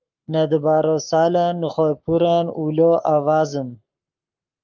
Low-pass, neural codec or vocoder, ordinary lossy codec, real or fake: 7.2 kHz; codec, 44.1 kHz, 7.8 kbps, Pupu-Codec; Opus, 32 kbps; fake